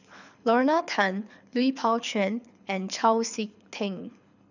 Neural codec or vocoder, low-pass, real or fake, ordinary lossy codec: codec, 24 kHz, 6 kbps, HILCodec; 7.2 kHz; fake; none